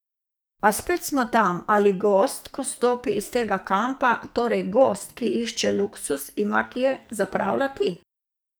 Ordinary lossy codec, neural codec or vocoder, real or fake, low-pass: none; codec, 44.1 kHz, 2.6 kbps, SNAC; fake; none